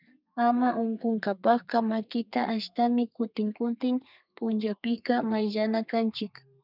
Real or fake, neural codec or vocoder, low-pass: fake; codec, 32 kHz, 1.9 kbps, SNAC; 5.4 kHz